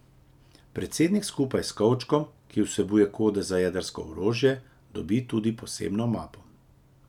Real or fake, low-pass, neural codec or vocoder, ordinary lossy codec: real; 19.8 kHz; none; none